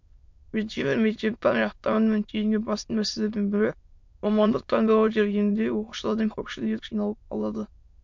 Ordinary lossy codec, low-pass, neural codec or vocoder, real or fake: MP3, 48 kbps; 7.2 kHz; autoencoder, 22.05 kHz, a latent of 192 numbers a frame, VITS, trained on many speakers; fake